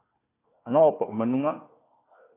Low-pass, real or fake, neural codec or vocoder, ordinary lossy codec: 3.6 kHz; fake; codec, 16 kHz, 1 kbps, FunCodec, trained on Chinese and English, 50 frames a second; AAC, 16 kbps